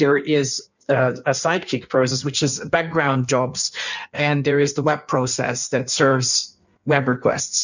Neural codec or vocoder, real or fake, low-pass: codec, 16 kHz in and 24 kHz out, 1.1 kbps, FireRedTTS-2 codec; fake; 7.2 kHz